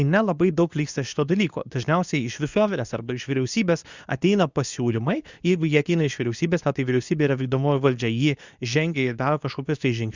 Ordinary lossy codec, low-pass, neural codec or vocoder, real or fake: Opus, 64 kbps; 7.2 kHz; codec, 24 kHz, 0.9 kbps, WavTokenizer, medium speech release version 2; fake